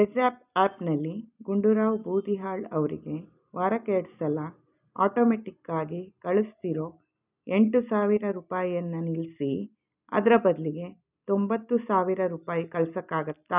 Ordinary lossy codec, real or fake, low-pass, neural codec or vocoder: none; real; 3.6 kHz; none